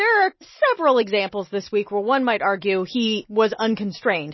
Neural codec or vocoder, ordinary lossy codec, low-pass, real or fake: none; MP3, 24 kbps; 7.2 kHz; real